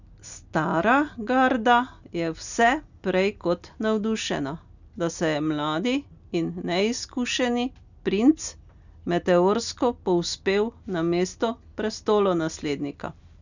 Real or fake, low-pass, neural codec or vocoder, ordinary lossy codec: real; 7.2 kHz; none; none